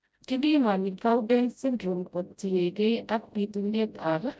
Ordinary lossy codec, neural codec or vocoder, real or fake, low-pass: none; codec, 16 kHz, 0.5 kbps, FreqCodec, smaller model; fake; none